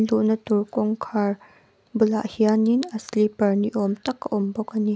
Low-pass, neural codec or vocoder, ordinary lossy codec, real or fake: none; none; none; real